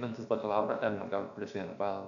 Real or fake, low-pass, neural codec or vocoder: fake; 7.2 kHz; codec, 16 kHz, about 1 kbps, DyCAST, with the encoder's durations